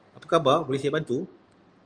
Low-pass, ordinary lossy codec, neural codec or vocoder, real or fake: 9.9 kHz; Opus, 32 kbps; none; real